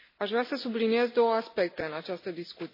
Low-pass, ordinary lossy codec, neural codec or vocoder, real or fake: 5.4 kHz; MP3, 24 kbps; none; real